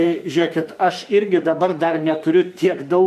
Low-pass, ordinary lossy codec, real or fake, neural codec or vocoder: 14.4 kHz; AAC, 96 kbps; fake; autoencoder, 48 kHz, 32 numbers a frame, DAC-VAE, trained on Japanese speech